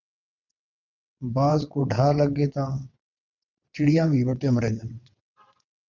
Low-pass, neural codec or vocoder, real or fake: 7.2 kHz; vocoder, 22.05 kHz, 80 mel bands, WaveNeXt; fake